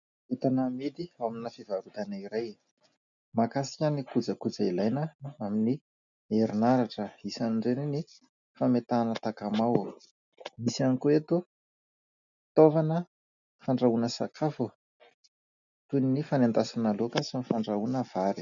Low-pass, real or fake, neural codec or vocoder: 7.2 kHz; real; none